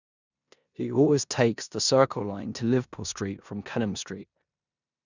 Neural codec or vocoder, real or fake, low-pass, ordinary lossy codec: codec, 16 kHz in and 24 kHz out, 0.9 kbps, LongCat-Audio-Codec, four codebook decoder; fake; 7.2 kHz; none